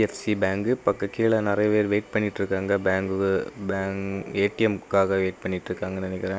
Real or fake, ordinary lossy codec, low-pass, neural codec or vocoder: real; none; none; none